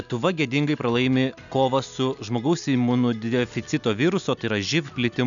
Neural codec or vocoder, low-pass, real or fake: none; 7.2 kHz; real